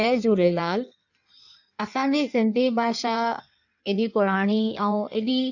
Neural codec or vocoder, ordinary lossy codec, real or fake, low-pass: codec, 16 kHz in and 24 kHz out, 1.1 kbps, FireRedTTS-2 codec; none; fake; 7.2 kHz